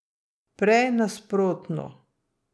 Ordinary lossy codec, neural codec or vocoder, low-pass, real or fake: none; none; none; real